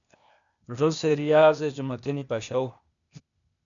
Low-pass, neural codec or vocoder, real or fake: 7.2 kHz; codec, 16 kHz, 0.8 kbps, ZipCodec; fake